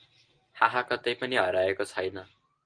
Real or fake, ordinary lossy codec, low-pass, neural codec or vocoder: real; Opus, 24 kbps; 9.9 kHz; none